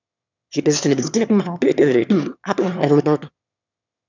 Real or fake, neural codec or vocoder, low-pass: fake; autoencoder, 22.05 kHz, a latent of 192 numbers a frame, VITS, trained on one speaker; 7.2 kHz